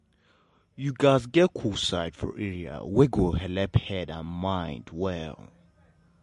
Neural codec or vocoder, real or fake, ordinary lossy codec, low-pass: none; real; MP3, 48 kbps; 14.4 kHz